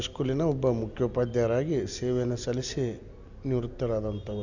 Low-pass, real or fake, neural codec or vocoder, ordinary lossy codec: 7.2 kHz; real; none; none